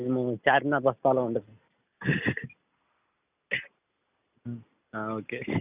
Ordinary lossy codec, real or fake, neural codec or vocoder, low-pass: Opus, 64 kbps; real; none; 3.6 kHz